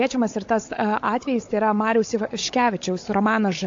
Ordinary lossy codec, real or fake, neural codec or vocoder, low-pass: AAC, 48 kbps; fake; codec, 16 kHz, 16 kbps, FunCodec, trained on Chinese and English, 50 frames a second; 7.2 kHz